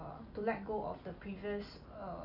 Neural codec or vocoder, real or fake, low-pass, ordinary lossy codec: none; real; 5.4 kHz; none